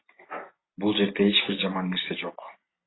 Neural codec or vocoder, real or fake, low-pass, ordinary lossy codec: none; real; 7.2 kHz; AAC, 16 kbps